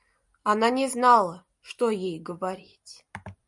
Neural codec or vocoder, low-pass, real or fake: none; 10.8 kHz; real